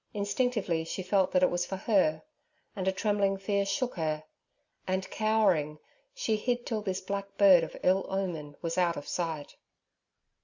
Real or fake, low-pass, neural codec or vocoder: real; 7.2 kHz; none